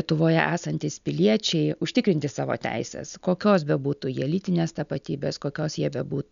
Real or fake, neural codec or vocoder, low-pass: real; none; 7.2 kHz